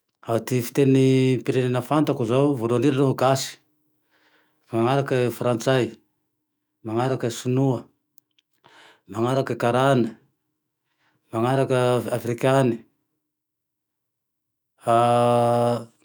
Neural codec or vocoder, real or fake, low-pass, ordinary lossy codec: autoencoder, 48 kHz, 128 numbers a frame, DAC-VAE, trained on Japanese speech; fake; none; none